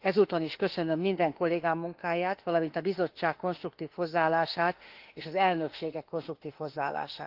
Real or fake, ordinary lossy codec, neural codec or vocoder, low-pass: fake; Opus, 16 kbps; autoencoder, 48 kHz, 32 numbers a frame, DAC-VAE, trained on Japanese speech; 5.4 kHz